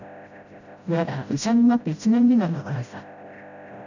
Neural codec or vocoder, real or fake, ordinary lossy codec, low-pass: codec, 16 kHz, 0.5 kbps, FreqCodec, smaller model; fake; none; 7.2 kHz